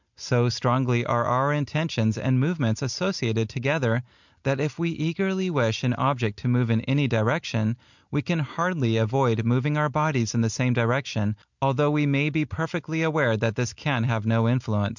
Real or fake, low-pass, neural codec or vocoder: real; 7.2 kHz; none